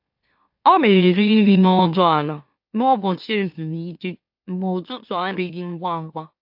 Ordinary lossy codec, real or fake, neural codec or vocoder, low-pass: AAC, 48 kbps; fake; autoencoder, 44.1 kHz, a latent of 192 numbers a frame, MeloTTS; 5.4 kHz